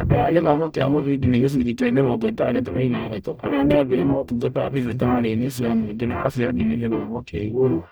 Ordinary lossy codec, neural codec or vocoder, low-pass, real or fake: none; codec, 44.1 kHz, 0.9 kbps, DAC; none; fake